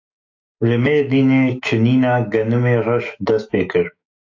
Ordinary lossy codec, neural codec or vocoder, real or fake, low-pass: AAC, 48 kbps; autoencoder, 48 kHz, 128 numbers a frame, DAC-VAE, trained on Japanese speech; fake; 7.2 kHz